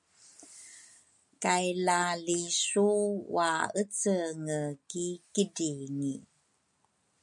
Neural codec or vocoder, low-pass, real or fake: none; 10.8 kHz; real